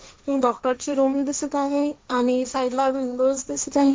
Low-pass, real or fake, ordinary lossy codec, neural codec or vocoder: none; fake; none; codec, 16 kHz, 1.1 kbps, Voila-Tokenizer